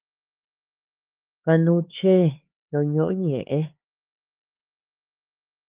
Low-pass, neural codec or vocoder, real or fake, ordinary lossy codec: 3.6 kHz; codec, 16 kHz, 4 kbps, X-Codec, HuBERT features, trained on LibriSpeech; fake; Opus, 32 kbps